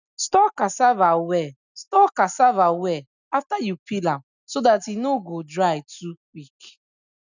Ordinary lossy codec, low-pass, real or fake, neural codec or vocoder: none; 7.2 kHz; real; none